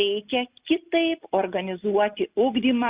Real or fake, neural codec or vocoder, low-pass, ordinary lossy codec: real; none; 3.6 kHz; Opus, 64 kbps